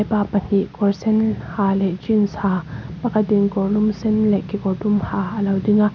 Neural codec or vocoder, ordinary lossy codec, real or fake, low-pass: none; none; real; none